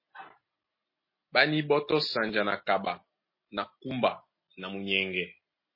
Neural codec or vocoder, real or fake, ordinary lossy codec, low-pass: none; real; MP3, 24 kbps; 5.4 kHz